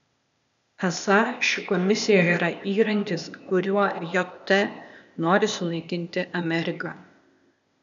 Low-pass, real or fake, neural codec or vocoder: 7.2 kHz; fake; codec, 16 kHz, 0.8 kbps, ZipCodec